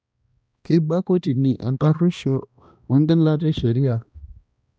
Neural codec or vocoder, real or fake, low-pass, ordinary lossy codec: codec, 16 kHz, 1 kbps, X-Codec, HuBERT features, trained on balanced general audio; fake; none; none